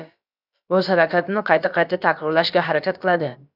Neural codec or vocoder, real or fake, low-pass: codec, 16 kHz, about 1 kbps, DyCAST, with the encoder's durations; fake; 5.4 kHz